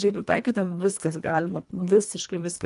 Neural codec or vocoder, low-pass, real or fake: codec, 24 kHz, 1.5 kbps, HILCodec; 10.8 kHz; fake